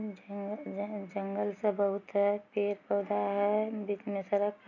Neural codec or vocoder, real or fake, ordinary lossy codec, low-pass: none; real; none; 7.2 kHz